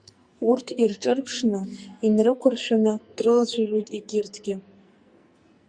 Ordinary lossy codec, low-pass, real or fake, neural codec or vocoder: Opus, 64 kbps; 9.9 kHz; fake; codec, 44.1 kHz, 2.6 kbps, SNAC